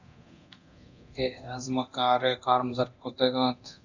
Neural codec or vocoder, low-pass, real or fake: codec, 24 kHz, 0.9 kbps, DualCodec; 7.2 kHz; fake